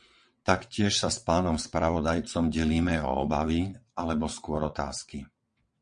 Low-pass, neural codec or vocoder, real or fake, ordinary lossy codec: 9.9 kHz; vocoder, 22.05 kHz, 80 mel bands, WaveNeXt; fake; MP3, 48 kbps